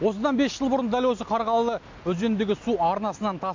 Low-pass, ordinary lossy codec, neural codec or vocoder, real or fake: 7.2 kHz; MP3, 48 kbps; none; real